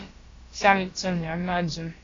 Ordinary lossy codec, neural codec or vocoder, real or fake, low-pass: AAC, 32 kbps; codec, 16 kHz, about 1 kbps, DyCAST, with the encoder's durations; fake; 7.2 kHz